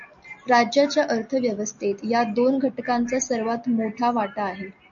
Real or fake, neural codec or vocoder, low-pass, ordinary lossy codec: real; none; 7.2 kHz; MP3, 48 kbps